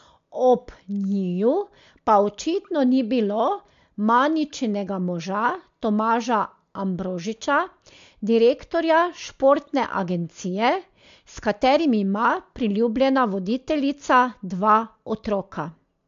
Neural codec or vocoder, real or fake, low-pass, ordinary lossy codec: none; real; 7.2 kHz; AAC, 64 kbps